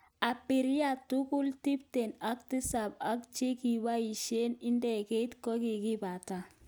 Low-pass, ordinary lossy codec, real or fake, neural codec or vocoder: none; none; real; none